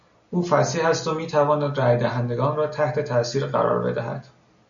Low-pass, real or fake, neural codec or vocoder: 7.2 kHz; real; none